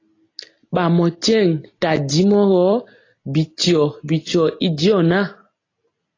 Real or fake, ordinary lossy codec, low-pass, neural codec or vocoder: real; AAC, 32 kbps; 7.2 kHz; none